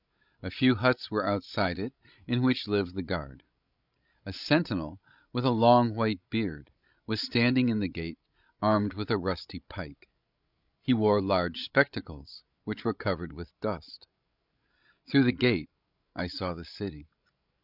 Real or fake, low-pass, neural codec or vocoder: fake; 5.4 kHz; codec, 16 kHz, 16 kbps, FreqCodec, larger model